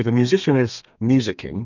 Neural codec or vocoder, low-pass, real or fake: codec, 32 kHz, 1.9 kbps, SNAC; 7.2 kHz; fake